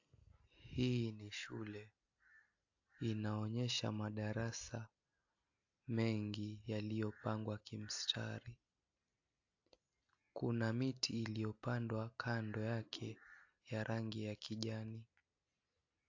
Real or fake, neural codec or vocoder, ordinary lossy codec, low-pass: real; none; AAC, 48 kbps; 7.2 kHz